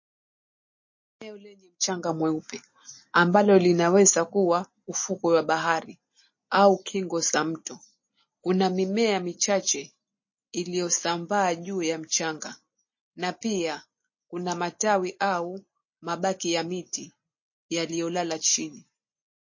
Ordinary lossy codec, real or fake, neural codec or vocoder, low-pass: MP3, 32 kbps; real; none; 7.2 kHz